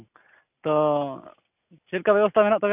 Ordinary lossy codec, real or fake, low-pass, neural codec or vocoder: none; real; 3.6 kHz; none